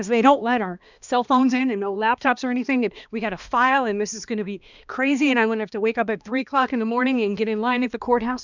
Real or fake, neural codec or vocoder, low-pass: fake; codec, 16 kHz, 2 kbps, X-Codec, HuBERT features, trained on balanced general audio; 7.2 kHz